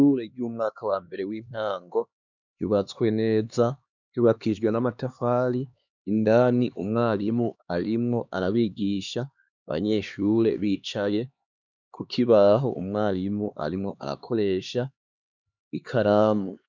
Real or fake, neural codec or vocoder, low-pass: fake; codec, 16 kHz, 2 kbps, X-Codec, HuBERT features, trained on LibriSpeech; 7.2 kHz